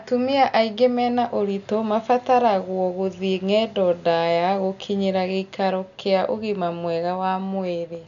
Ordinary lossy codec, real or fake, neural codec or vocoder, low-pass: none; real; none; 7.2 kHz